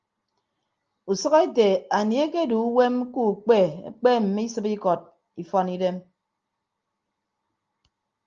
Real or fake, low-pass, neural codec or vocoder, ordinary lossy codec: real; 7.2 kHz; none; Opus, 32 kbps